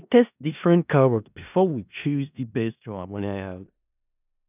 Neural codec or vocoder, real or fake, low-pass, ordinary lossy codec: codec, 16 kHz in and 24 kHz out, 0.4 kbps, LongCat-Audio-Codec, four codebook decoder; fake; 3.6 kHz; none